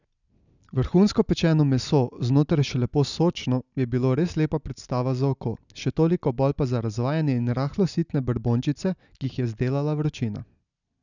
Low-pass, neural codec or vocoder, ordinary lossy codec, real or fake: 7.2 kHz; none; none; real